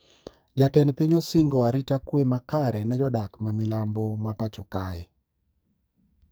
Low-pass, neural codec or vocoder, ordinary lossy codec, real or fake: none; codec, 44.1 kHz, 2.6 kbps, SNAC; none; fake